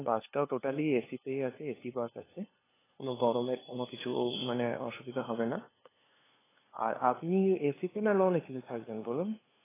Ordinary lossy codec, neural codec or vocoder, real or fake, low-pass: AAC, 16 kbps; codec, 16 kHz, 2 kbps, FunCodec, trained on LibriTTS, 25 frames a second; fake; 3.6 kHz